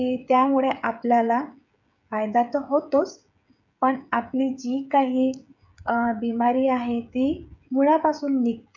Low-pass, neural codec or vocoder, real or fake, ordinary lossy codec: 7.2 kHz; codec, 16 kHz, 16 kbps, FreqCodec, smaller model; fake; none